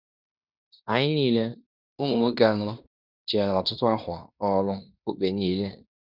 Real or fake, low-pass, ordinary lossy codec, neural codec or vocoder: fake; 5.4 kHz; none; codec, 16 kHz in and 24 kHz out, 0.9 kbps, LongCat-Audio-Codec, fine tuned four codebook decoder